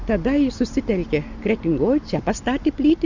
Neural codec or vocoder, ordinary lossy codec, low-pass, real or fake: none; Opus, 64 kbps; 7.2 kHz; real